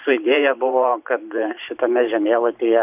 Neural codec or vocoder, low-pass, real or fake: vocoder, 44.1 kHz, 128 mel bands every 512 samples, BigVGAN v2; 3.6 kHz; fake